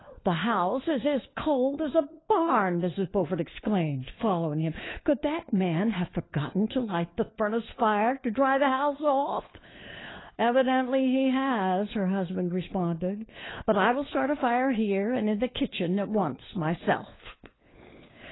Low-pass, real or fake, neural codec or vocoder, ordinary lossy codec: 7.2 kHz; fake; codec, 16 kHz, 4 kbps, FunCodec, trained on LibriTTS, 50 frames a second; AAC, 16 kbps